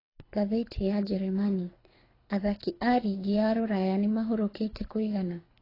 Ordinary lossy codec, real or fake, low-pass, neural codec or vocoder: AAC, 24 kbps; fake; 5.4 kHz; codec, 24 kHz, 6 kbps, HILCodec